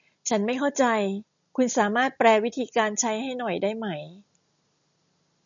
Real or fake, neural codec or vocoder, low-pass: real; none; 7.2 kHz